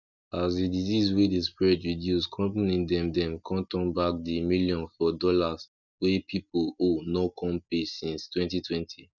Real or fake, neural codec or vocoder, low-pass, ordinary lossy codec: real; none; 7.2 kHz; none